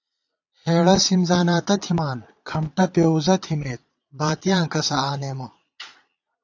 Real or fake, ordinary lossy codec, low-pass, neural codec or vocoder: fake; AAC, 48 kbps; 7.2 kHz; vocoder, 22.05 kHz, 80 mel bands, Vocos